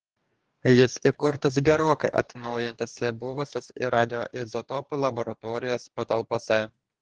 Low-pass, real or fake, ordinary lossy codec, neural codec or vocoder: 9.9 kHz; fake; Opus, 24 kbps; codec, 44.1 kHz, 2.6 kbps, DAC